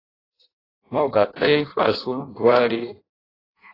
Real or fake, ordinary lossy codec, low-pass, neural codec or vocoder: fake; AAC, 24 kbps; 5.4 kHz; codec, 16 kHz in and 24 kHz out, 0.6 kbps, FireRedTTS-2 codec